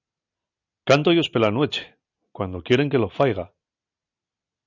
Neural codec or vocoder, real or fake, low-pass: none; real; 7.2 kHz